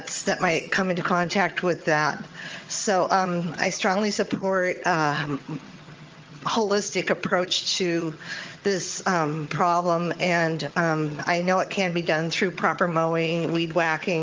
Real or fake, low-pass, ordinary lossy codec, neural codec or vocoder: fake; 7.2 kHz; Opus, 24 kbps; vocoder, 22.05 kHz, 80 mel bands, HiFi-GAN